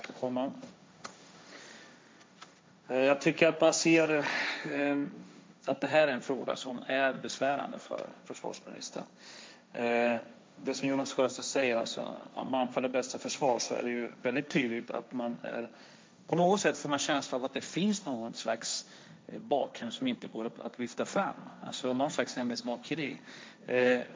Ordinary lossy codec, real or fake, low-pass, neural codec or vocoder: none; fake; none; codec, 16 kHz, 1.1 kbps, Voila-Tokenizer